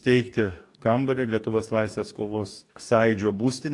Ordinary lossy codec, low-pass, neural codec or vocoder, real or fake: AAC, 48 kbps; 10.8 kHz; codec, 44.1 kHz, 2.6 kbps, DAC; fake